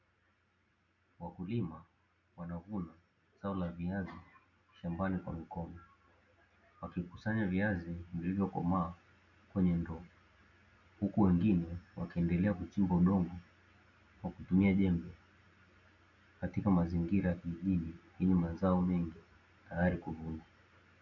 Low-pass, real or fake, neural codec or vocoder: 7.2 kHz; real; none